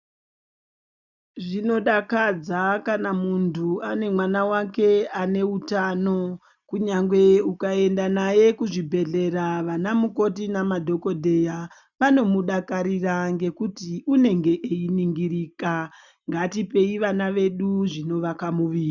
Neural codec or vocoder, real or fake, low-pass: none; real; 7.2 kHz